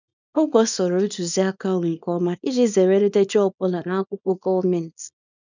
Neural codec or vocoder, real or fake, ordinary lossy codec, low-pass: codec, 24 kHz, 0.9 kbps, WavTokenizer, small release; fake; none; 7.2 kHz